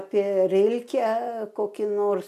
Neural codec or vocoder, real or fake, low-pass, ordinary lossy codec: none; real; 14.4 kHz; MP3, 96 kbps